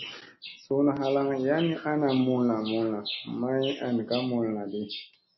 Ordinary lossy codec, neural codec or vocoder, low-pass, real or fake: MP3, 24 kbps; none; 7.2 kHz; real